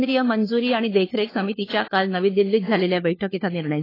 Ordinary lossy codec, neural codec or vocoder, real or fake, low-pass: AAC, 24 kbps; codec, 16 kHz, 4 kbps, FunCodec, trained on LibriTTS, 50 frames a second; fake; 5.4 kHz